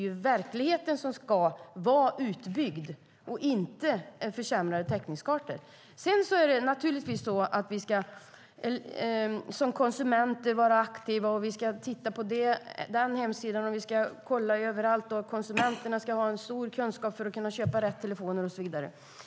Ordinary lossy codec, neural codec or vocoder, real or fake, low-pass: none; none; real; none